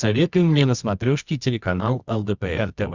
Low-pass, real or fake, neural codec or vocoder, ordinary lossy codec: 7.2 kHz; fake; codec, 24 kHz, 0.9 kbps, WavTokenizer, medium music audio release; Opus, 64 kbps